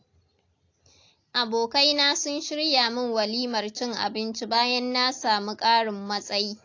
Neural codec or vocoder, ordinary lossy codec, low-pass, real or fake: none; AAC, 48 kbps; 7.2 kHz; real